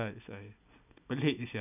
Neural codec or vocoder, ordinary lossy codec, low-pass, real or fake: none; none; 3.6 kHz; real